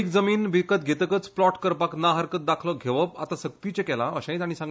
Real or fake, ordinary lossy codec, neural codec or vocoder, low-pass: real; none; none; none